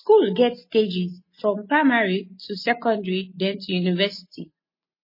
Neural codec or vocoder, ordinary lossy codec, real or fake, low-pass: none; MP3, 24 kbps; real; 5.4 kHz